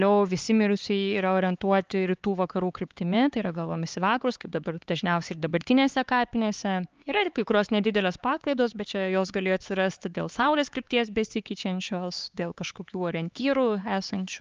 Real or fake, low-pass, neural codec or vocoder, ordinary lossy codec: fake; 7.2 kHz; codec, 16 kHz, 4 kbps, X-Codec, HuBERT features, trained on LibriSpeech; Opus, 24 kbps